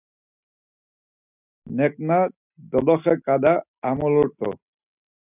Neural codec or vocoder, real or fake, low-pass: none; real; 3.6 kHz